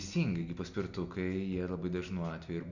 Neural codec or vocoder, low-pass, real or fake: none; 7.2 kHz; real